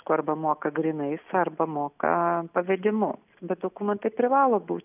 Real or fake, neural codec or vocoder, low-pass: real; none; 3.6 kHz